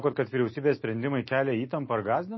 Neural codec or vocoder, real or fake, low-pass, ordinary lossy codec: none; real; 7.2 kHz; MP3, 24 kbps